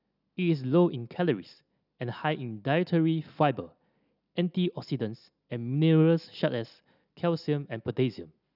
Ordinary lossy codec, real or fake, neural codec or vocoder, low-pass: none; real; none; 5.4 kHz